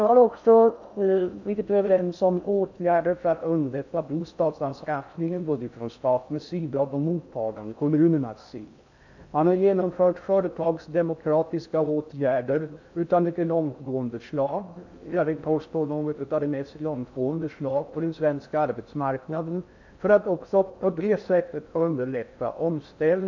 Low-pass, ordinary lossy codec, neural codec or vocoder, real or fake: 7.2 kHz; none; codec, 16 kHz in and 24 kHz out, 0.6 kbps, FocalCodec, streaming, 4096 codes; fake